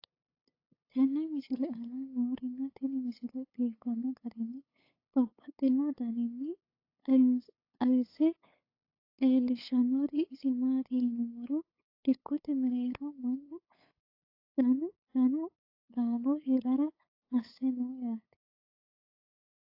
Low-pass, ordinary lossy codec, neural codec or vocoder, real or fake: 5.4 kHz; AAC, 32 kbps; codec, 16 kHz, 8 kbps, FunCodec, trained on LibriTTS, 25 frames a second; fake